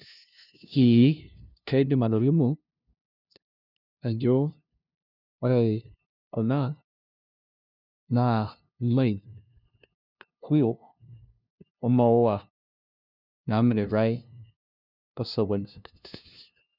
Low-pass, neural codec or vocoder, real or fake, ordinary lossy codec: 5.4 kHz; codec, 16 kHz, 0.5 kbps, FunCodec, trained on LibriTTS, 25 frames a second; fake; none